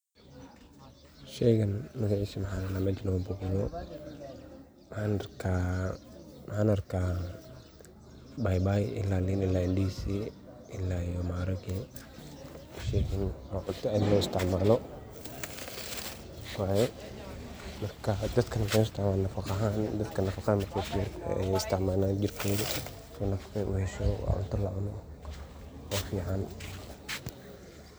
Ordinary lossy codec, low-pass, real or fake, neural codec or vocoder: none; none; real; none